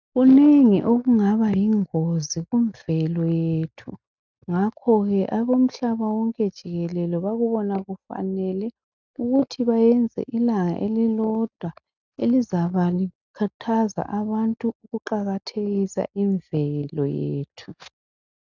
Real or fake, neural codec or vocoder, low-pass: real; none; 7.2 kHz